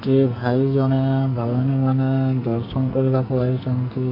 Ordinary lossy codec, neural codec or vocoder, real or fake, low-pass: MP3, 32 kbps; codec, 44.1 kHz, 2.6 kbps, SNAC; fake; 5.4 kHz